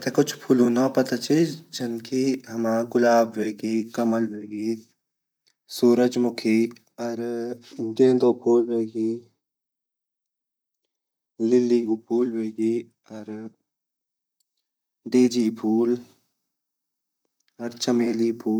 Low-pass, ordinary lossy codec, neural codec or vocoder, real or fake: none; none; vocoder, 44.1 kHz, 128 mel bands, Pupu-Vocoder; fake